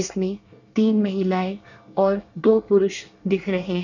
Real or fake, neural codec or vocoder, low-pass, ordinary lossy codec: fake; codec, 24 kHz, 1 kbps, SNAC; 7.2 kHz; none